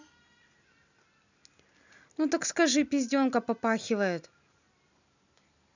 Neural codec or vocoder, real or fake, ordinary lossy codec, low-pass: none; real; none; 7.2 kHz